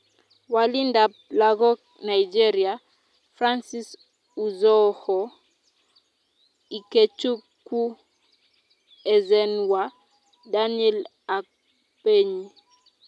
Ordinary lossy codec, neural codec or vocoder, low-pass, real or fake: none; none; none; real